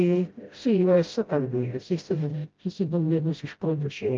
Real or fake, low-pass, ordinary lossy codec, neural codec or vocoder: fake; 7.2 kHz; Opus, 24 kbps; codec, 16 kHz, 0.5 kbps, FreqCodec, smaller model